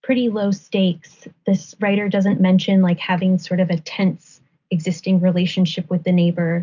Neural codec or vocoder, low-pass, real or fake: none; 7.2 kHz; real